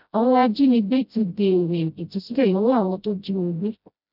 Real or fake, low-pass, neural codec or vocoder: fake; 5.4 kHz; codec, 16 kHz, 0.5 kbps, FreqCodec, smaller model